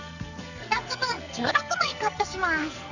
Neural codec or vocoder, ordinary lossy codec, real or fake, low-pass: codec, 44.1 kHz, 2.6 kbps, SNAC; none; fake; 7.2 kHz